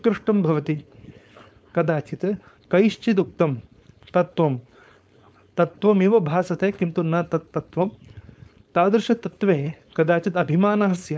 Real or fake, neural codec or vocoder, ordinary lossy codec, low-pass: fake; codec, 16 kHz, 4.8 kbps, FACodec; none; none